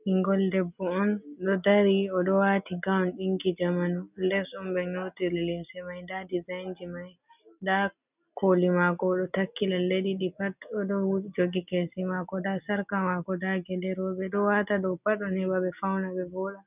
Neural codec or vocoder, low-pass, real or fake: none; 3.6 kHz; real